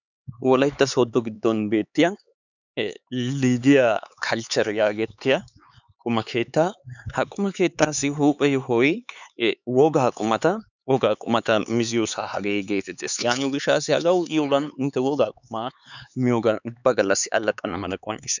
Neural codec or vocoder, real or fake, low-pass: codec, 16 kHz, 4 kbps, X-Codec, HuBERT features, trained on LibriSpeech; fake; 7.2 kHz